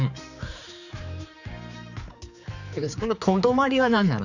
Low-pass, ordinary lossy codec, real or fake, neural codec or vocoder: 7.2 kHz; none; fake; codec, 16 kHz, 4 kbps, X-Codec, HuBERT features, trained on general audio